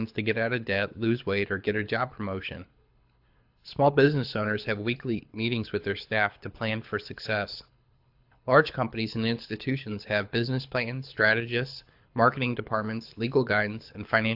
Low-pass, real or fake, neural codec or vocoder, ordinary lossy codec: 5.4 kHz; fake; codec, 24 kHz, 6 kbps, HILCodec; AAC, 48 kbps